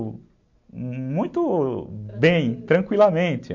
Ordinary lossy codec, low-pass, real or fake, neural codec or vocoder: MP3, 48 kbps; 7.2 kHz; real; none